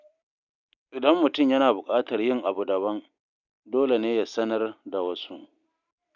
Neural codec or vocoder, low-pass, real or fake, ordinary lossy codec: none; 7.2 kHz; real; none